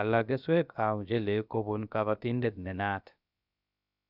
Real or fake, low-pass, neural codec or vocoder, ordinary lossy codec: fake; 5.4 kHz; codec, 16 kHz, about 1 kbps, DyCAST, with the encoder's durations; none